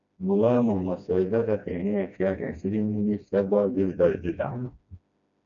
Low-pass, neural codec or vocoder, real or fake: 7.2 kHz; codec, 16 kHz, 1 kbps, FreqCodec, smaller model; fake